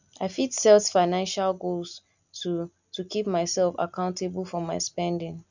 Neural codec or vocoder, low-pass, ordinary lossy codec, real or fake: none; 7.2 kHz; none; real